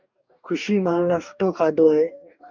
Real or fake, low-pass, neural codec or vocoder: fake; 7.2 kHz; codec, 44.1 kHz, 2.6 kbps, DAC